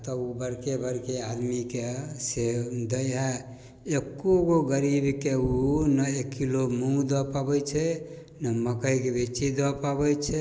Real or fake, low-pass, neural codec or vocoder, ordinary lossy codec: real; none; none; none